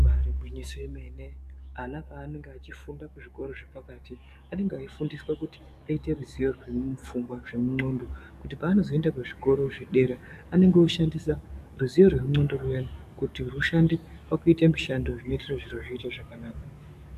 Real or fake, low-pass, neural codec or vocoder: fake; 14.4 kHz; codec, 44.1 kHz, 7.8 kbps, DAC